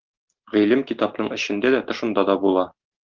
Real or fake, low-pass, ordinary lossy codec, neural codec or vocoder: real; 7.2 kHz; Opus, 16 kbps; none